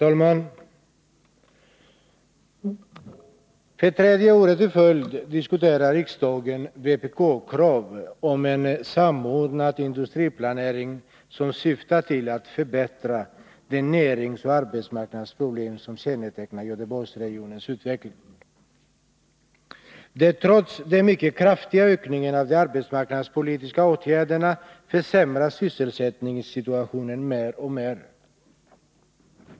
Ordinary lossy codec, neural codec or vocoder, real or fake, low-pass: none; none; real; none